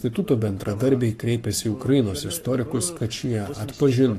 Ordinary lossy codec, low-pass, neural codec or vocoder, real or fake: AAC, 64 kbps; 14.4 kHz; codec, 44.1 kHz, 7.8 kbps, Pupu-Codec; fake